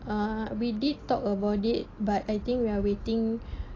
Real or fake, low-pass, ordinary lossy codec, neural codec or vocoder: real; 7.2 kHz; AAC, 32 kbps; none